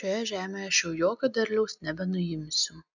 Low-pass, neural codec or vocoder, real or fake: 7.2 kHz; none; real